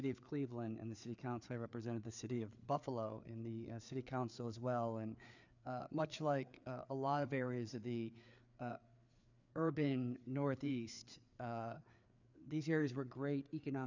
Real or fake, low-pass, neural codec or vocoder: fake; 7.2 kHz; codec, 16 kHz, 4 kbps, FreqCodec, larger model